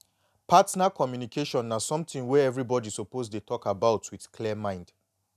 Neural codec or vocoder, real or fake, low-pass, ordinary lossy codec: none; real; 14.4 kHz; none